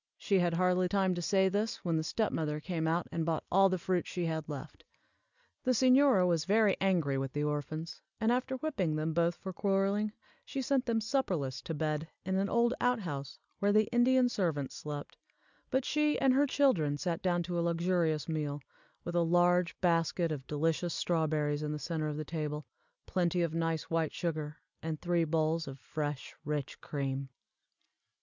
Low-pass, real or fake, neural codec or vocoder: 7.2 kHz; real; none